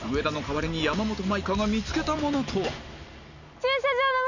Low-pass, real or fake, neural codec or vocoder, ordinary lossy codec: 7.2 kHz; real; none; none